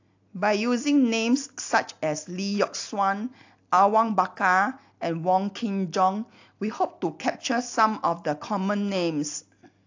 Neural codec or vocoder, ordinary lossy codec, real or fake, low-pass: none; AAC, 48 kbps; real; 7.2 kHz